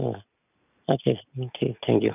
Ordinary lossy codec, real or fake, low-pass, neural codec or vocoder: none; real; 3.6 kHz; none